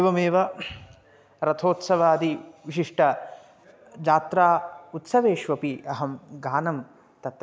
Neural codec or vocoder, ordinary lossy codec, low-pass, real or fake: none; none; none; real